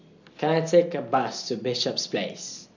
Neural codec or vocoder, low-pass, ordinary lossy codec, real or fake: none; 7.2 kHz; none; real